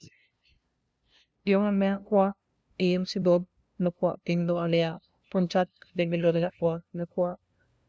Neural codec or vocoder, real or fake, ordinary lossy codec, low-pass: codec, 16 kHz, 0.5 kbps, FunCodec, trained on LibriTTS, 25 frames a second; fake; none; none